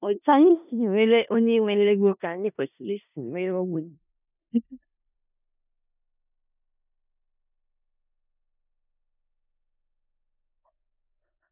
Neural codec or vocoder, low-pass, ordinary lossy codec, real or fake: codec, 16 kHz in and 24 kHz out, 0.4 kbps, LongCat-Audio-Codec, four codebook decoder; 3.6 kHz; none; fake